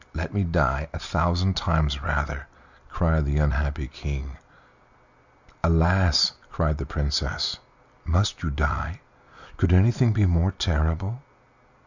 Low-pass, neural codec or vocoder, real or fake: 7.2 kHz; none; real